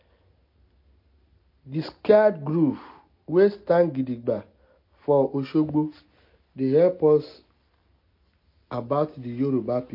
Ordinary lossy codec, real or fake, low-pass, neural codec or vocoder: MP3, 32 kbps; real; 5.4 kHz; none